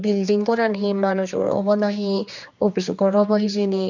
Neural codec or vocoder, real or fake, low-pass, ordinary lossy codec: codec, 16 kHz, 2 kbps, X-Codec, HuBERT features, trained on general audio; fake; 7.2 kHz; none